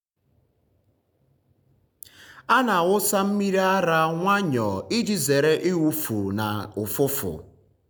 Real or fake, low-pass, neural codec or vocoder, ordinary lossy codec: real; none; none; none